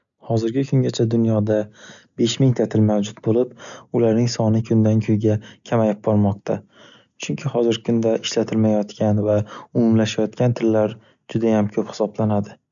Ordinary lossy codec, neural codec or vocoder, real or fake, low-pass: none; none; real; 7.2 kHz